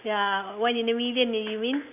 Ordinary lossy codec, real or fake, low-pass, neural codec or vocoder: none; real; 3.6 kHz; none